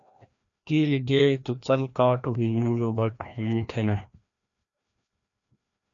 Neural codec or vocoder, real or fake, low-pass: codec, 16 kHz, 1 kbps, FreqCodec, larger model; fake; 7.2 kHz